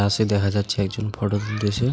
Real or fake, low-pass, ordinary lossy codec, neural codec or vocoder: real; none; none; none